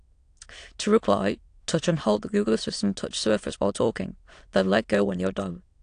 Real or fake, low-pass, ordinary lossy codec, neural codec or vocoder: fake; 9.9 kHz; AAC, 48 kbps; autoencoder, 22.05 kHz, a latent of 192 numbers a frame, VITS, trained on many speakers